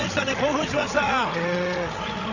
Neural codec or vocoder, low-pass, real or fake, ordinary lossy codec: codec, 16 kHz, 16 kbps, FreqCodec, larger model; 7.2 kHz; fake; none